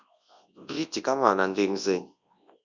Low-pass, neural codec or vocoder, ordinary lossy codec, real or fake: 7.2 kHz; codec, 24 kHz, 0.9 kbps, WavTokenizer, large speech release; Opus, 64 kbps; fake